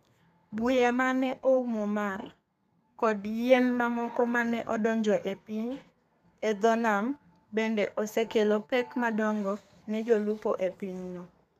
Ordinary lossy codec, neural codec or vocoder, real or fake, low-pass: none; codec, 32 kHz, 1.9 kbps, SNAC; fake; 14.4 kHz